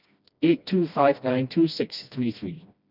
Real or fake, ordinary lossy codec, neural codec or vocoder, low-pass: fake; none; codec, 16 kHz, 1 kbps, FreqCodec, smaller model; 5.4 kHz